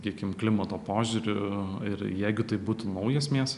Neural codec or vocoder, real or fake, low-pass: none; real; 10.8 kHz